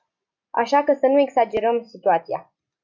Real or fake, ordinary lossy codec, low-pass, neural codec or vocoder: real; MP3, 64 kbps; 7.2 kHz; none